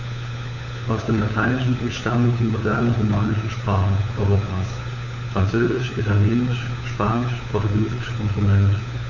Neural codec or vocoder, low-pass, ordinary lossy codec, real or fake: codec, 16 kHz, 4 kbps, FunCodec, trained on LibriTTS, 50 frames a second; 7.2 kHz; none; fake